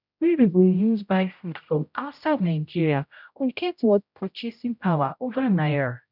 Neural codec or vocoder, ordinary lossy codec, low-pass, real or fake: codec, 16 kHz, 0.5 kbps, X-Codec, HuBERT features, trained on general audio; none; 5.4 kHz; fake